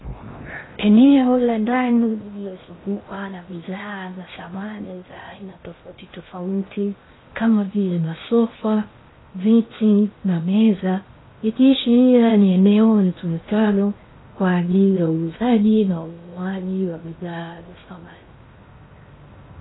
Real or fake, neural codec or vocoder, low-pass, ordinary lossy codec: fake; codec, 16 kHz in and 24 kHz out, 0.6 kbps, FocalCodec, streaming, 4096 codes; 7.2 kHz; AAC, 16 kbps